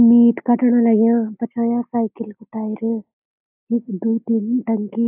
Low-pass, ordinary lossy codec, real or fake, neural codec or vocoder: 3.6 kHz; AAC, 32 kbps; real; none